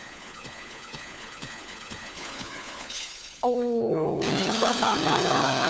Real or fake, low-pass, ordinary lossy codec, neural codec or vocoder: fake; none; none; codec, 16 kHz, 4 kbps, FunCodec, trained on LibriTTS, 50 frames a second